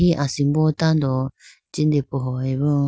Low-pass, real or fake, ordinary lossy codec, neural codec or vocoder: none; real; none; none